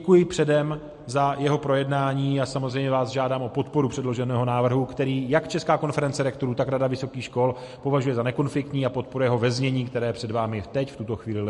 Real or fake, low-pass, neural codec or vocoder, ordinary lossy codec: real; 14.4 kHz; none; MP3, 48 kbps